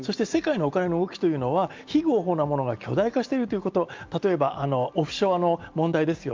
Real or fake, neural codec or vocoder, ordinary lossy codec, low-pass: real; none; Opus, 32 kbps; 7.2 kHz